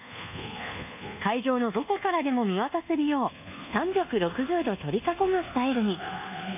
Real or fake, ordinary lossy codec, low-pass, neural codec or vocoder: fake; none; 3.6 kHz; codec, 24 kHz, 1.2 kbps, DualCodec